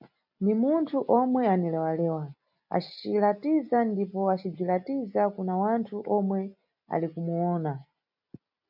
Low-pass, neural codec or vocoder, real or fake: 5.4 kHz; none; real